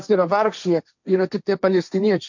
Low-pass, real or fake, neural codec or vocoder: 7.2 kHz; fake; codec, 16 kHz, 1.1 kbps, Voila-Tokenizer